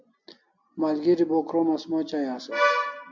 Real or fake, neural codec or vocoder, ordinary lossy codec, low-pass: real; none; MP3, 64 kbps; 7.2 kHz